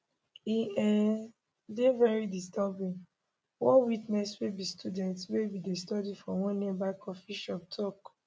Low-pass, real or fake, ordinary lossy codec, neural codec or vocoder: none; real; none; none